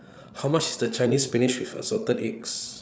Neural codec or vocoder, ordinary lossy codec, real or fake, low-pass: codec, 16 kHz, 8 kbps, FreqCodec, larger model; none; fake; none